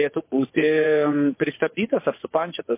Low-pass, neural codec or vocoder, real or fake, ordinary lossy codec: 3.6 kHz; vocoder, 24 kHz, 100 mel bands, Vocos; fake; MP3, 24 kbps